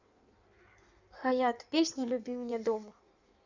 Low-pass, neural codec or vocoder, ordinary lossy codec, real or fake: 7.2 kHz; codec, 16 kHz in and 24 kHz out, 1.1 kbps, FireRedTTS-2 codec; none; fake